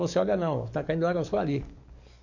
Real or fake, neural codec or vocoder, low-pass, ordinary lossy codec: fake; codec, 44.1 kHz, 7.8 kbps, DAC; 7.2 kHz; none